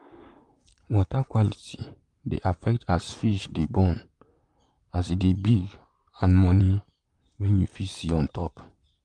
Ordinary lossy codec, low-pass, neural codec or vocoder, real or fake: Opus, 32 kbps; 10.8 kHz; vocoder, 44.1 kHz, 128 mel bands, Pupu-Vocoder; fake